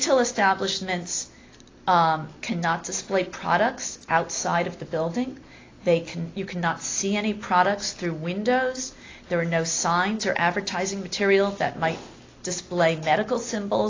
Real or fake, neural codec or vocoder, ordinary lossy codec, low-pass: real; none; AAC, 32 kbps; 7.2 kHz